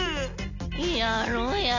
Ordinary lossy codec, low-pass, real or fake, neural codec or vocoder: AAC, 32 kbps; 7.2 kHz; real; none